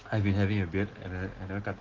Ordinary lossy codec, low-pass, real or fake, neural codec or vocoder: Opus, 16 kbps; 7.2 kHz; real; none